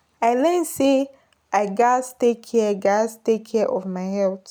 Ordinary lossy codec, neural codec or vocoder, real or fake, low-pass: none; none; real; none